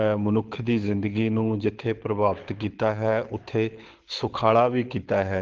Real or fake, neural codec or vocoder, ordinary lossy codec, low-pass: fake; codec, 24 kHz, 6 kbps, HILCodec; Opus, 16 kbps; 7.2 kHz